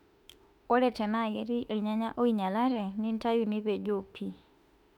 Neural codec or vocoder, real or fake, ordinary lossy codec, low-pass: autoencoder, 48 kHz, 32 numbers a frame, DAC-VAE, trained on Japanese speech; fake; none; 19.8 kHz